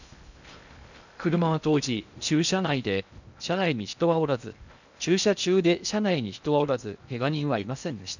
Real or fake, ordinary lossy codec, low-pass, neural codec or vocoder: fake; none; 7.2 kHz; codec, 16 kHz in and 24 kHz out, 0.6 kbps, FocalCodec, streaming, 2048 codes